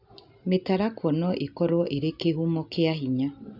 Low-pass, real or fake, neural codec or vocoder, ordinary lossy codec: 5.4 kHz; real; none; none